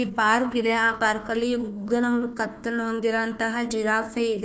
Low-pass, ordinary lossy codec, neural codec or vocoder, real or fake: none; none; codec, 16 kHz, 1 kbps, FunCodec, trained on Chinese and English, 50 frames a second; fake